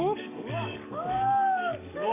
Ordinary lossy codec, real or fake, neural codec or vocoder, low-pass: none; fake; codec, 44.1 kHz, 7.8 kbps, DAC; 3.6 kHz